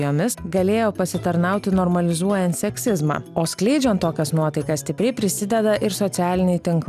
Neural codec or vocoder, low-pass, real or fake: none; 14.4 kHz; real